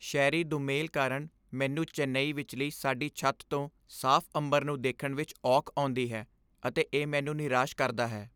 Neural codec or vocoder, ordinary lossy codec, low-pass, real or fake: none; none; none; real